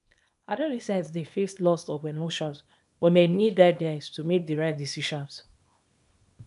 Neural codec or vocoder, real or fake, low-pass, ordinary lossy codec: codec, 24 kHz, 0.9 kbps, WavTokenizer, small release; fake; 10.8 kHz; none